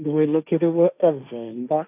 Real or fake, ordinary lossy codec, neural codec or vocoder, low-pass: fake; none; codec, 16 kHz, 1.1 kbps, Voila-Tokenizer; 3.6 kHz